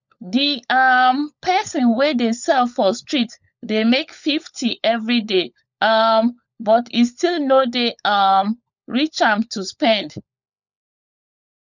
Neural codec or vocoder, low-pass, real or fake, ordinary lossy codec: codec, 16 kHz, 16 kbps, FunCodec, trained on LibriTTS, 50 frames a second; 7.2 kHz; fake; none